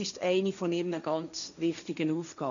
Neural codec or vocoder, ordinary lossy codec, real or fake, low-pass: codec, 16 kHz, 1.1 kbps, Voila-Tokenizer; none; fake; 7.2 kHz